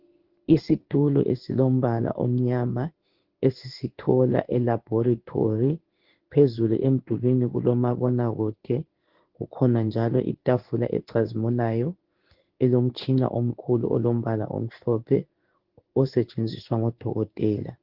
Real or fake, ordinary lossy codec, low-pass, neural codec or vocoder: fake; Opus, 16 kbps; 5.4 kHz; codec, 16 kHz in and 24 kHz out, 1 kbps, XY-Tokenizer